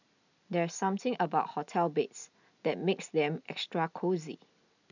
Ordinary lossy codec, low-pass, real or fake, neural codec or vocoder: none; 7.2 kHz; real; none